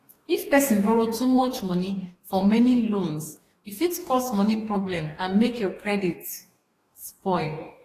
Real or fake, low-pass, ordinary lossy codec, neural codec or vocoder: fake; 14.4 kHz; AAC, 48 kbps; codec, 44.1 kHz, 2.6 kbps, DAC